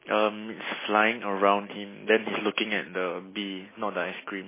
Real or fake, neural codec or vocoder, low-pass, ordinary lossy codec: real; none; 3.6 kHz; MP3, 16 kbps